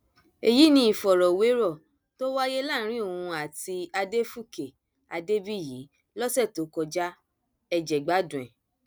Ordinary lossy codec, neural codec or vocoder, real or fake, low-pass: none; none; real; none